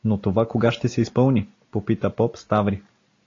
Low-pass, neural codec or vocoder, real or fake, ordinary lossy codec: 7.2 kHz; none; real; AAC, 32 kbps